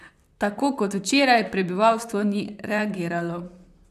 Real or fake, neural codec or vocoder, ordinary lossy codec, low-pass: fake; vocoder, 44.1 kHz, 128 mel bands, Pupu-Vocoder; none; 14.4 kHz